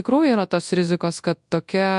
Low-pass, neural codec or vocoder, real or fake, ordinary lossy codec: 10.8 kHz; codec, 24 kHz, 0.9 kbps, WavTokenizer, large speech release; fake; MP3, 64 kbps